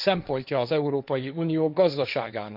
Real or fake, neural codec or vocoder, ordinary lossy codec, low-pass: fake; codec, 16 kHz, 1.1 kbps, Voila-Tokenizer; none; 5.4 kHz